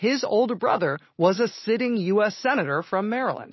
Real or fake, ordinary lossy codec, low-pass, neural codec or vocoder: real; MP3, 24 kbps; 7.2 kHz; none